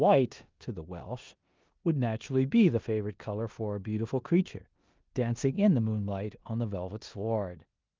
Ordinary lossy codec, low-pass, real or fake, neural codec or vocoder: Opus, 24 kbps; 7.2 kHz; fake; codec, 16 kHz in and 24 kHz out, 0.9 kbps, LongCat-Audio-Codec, four codebook decoder